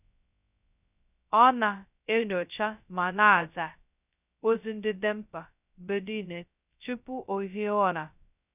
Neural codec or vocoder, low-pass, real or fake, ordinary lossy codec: codec, 16 kHz, 0.2 kbps, FocalCodec; 3.6 kHz; fake; none